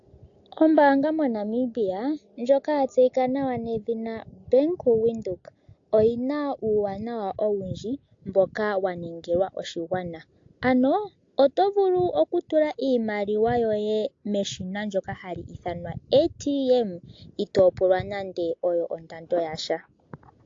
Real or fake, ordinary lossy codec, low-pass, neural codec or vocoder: real; AAC, 48 kbps; 7.2 kHz; none